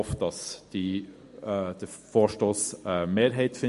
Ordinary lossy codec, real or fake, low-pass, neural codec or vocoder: MP3, 48 kbps; real; 14.4 kHz; none